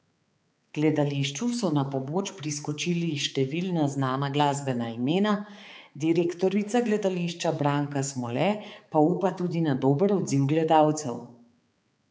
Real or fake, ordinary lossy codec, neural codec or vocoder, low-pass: fake; none; codec, 16 kHz, 4 kbps, X-Codec, HuBERT features, trained on balanced general audio; none